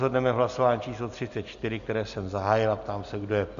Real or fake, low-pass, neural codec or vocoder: real; 7.2 kHz; none